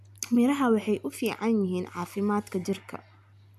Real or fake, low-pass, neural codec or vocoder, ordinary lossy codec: real; 14.4 kHz; none; none